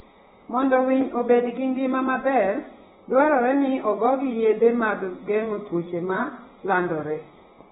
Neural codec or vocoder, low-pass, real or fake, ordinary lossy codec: vocoder, 44.1 kHz, 128 mel bands, Pupu-Vocoder; 19.8 kHz; fake; AAC, 16 kbps